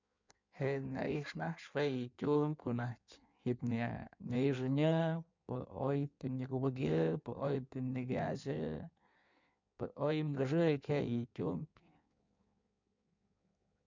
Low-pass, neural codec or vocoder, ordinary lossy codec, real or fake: 7.2 kHz; codec, 16 kHz in and 24 kHz out, 1.1 kbps, FireRedTTS-2 codec; MP3, 64 kbps; fake